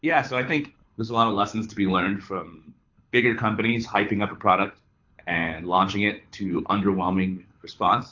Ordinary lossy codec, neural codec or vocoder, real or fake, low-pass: AAC, 48 kbps; codec, 24 kHz, 6 kbps, HILCodec; fake; 7.2 kHz